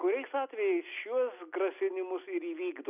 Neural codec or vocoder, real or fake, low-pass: none; real; 3.6 kHz